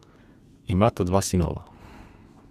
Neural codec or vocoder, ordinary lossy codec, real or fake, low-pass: codec, 32 kHz, 1.9 kbps, SNAC; Opus, 64 kbps; fake; 14.4 kHz